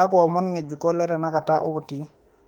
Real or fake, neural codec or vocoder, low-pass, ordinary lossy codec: fake; autoencoder, 48 kHz, 32 numbers a frame, DAC-VAE, trained on Japanese speech; 19.8 kHz; Opus, 32 kbps